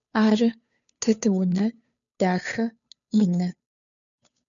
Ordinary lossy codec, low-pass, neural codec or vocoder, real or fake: MP3, 96 kbps; 7.2 kHz; codec, 16 kHz, 2 kbps, FunCodec, trained on Chinese and English, 25 frames a second; fake